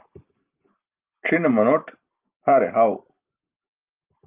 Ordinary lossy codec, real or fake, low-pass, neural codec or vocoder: Opus, 24 kbps; real; 3.6 kHz; none